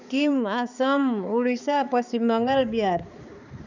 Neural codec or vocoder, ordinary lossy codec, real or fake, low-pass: codec, 16 kHz, 4 kbps, X-Codec, HuBERT features, trained on balanced general audio; none; fake; 7.2 kHz